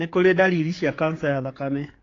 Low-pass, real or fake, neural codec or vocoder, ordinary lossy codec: 7.2 kHz; fake; codec, 16 kHz, 4 kbps, X-Codec, HuBERT features, trained on general audio; AAC, 32 kbps